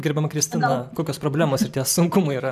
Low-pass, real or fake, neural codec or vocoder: 14.4 kHz; fake; vocoder, 44.1 kHz, 128 mel bands every 512 samples, BigVGAN v2